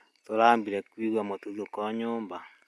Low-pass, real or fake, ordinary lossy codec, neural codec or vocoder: none; real; none; none